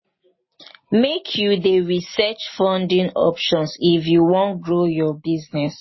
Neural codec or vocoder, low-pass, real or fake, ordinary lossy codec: none; 7.2 kHz; real; MP3, 24 kbps